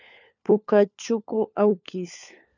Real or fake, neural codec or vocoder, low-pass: fake; codec, 16 kHz, 4 kbps, FunCodec, trained on LibriTTS, 50 frames a second; 7.2 kHz